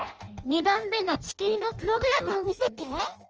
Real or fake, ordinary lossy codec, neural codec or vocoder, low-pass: fake; Opus, 24 kbps; codec, 16 kHz in and 24 kHz out, 0.6 kbps, FireRedTTS-2 codec; 7.2 kHz